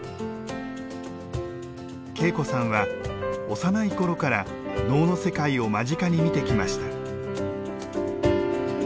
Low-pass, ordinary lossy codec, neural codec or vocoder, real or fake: none; none; none; real